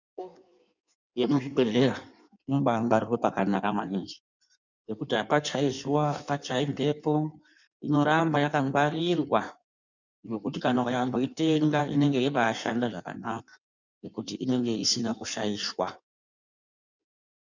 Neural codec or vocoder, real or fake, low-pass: codec, 16 kHz in and 24 kHz out, 1.1 kbps, FireRedTTS-2 codec; fake; 7.2 kHz